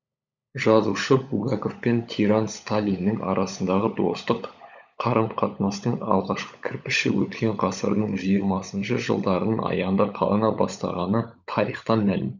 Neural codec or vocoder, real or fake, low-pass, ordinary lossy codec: codec, 16 kHz, 16 kbps, FunCodec, trained on LibriTTS, 50 frames a second; fake; 7.2 kHz; none